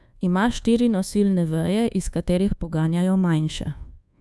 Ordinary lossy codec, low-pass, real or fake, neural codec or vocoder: none; none; fake; codec, 24 kHz, 1.2 kbps, DualCodec